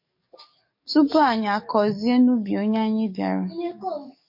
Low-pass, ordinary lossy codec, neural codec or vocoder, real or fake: 5.4 kHz; MP3, 32 kbps; codec, 44.1 kHz, 7.8 kbps, DAC; fake